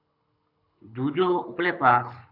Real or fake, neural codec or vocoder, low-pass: fake; codec, 24 kHz, 6 kbps, HILCodec; 5.4 kHz